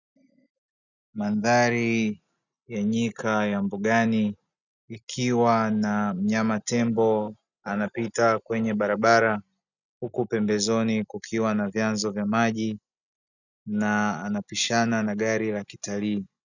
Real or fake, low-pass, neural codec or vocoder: real; 7.2 kHz; none